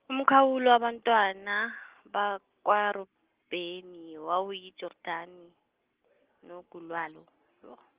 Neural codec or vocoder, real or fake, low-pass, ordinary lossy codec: none; real; 3.6 kHz; Opus, 16 kbps